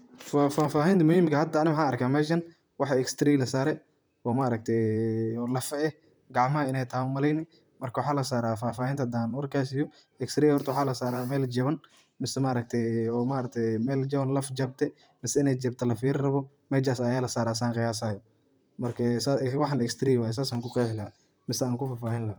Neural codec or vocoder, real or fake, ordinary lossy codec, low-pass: vocoder, 44.1 kHz, 128 mel bands, Pupu-Vocoder; fake; none; none